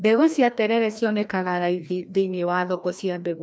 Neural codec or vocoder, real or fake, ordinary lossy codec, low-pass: codec, 16 kHz, 1 kbps, FreqCodec, larger model; fake; none; none